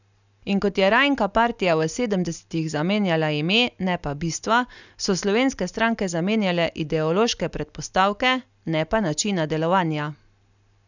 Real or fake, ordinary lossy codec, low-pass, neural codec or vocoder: real; none; 7.2 kHz; none